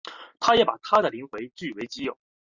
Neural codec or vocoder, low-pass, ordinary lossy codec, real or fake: none; 7.2 kHz; Opus, 64 kbps; real